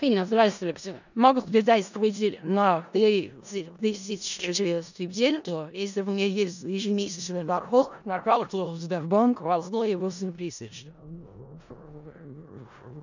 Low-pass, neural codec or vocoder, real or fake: 7.2 kHz; codec, 16 kHz in and 24 kHz out, 0.4 kbps, LongCat-Audio-Codec, four codebook decoder; fake